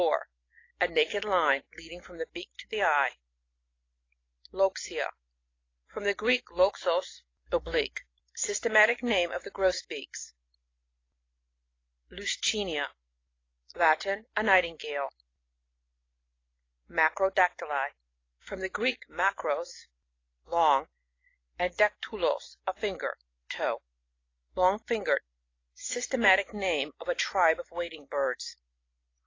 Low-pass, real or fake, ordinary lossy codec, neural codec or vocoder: 7.2 kHz; real; AAC, 32 kbps; none